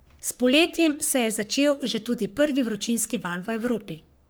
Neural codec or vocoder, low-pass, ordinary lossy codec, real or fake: codec, 44.1 kHz, 3.4 kbps, Pupu-Codec; none; none; fake